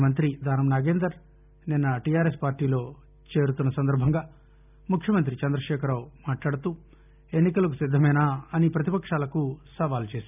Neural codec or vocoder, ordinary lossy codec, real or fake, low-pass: none; none; real; 3.6 kHz